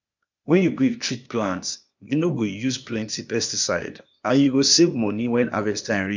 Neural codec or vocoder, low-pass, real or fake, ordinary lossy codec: codec, 16 kHz, 0.8 kbps, ZipCodec; 7.2 kHz; fake; MP3, 64 kbps